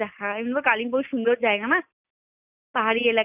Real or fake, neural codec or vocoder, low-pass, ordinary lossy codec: real; none; 3.6 kHz; none